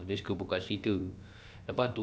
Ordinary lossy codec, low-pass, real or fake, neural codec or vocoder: none; none; fake; codec, 16 kHz, about 1 kbps, DyCAST, with the encoder's durations